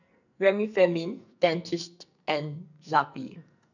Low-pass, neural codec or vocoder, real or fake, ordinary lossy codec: 7.2 kHz; codec, 44.1 kHz, 2.6 kbps, SNAC; fake; none